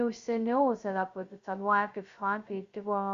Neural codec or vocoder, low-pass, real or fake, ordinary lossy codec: codec, 16 kHz, 0.2 kbps, FocalCodec; 7.2 kHz; fake; Opus, 64 kbps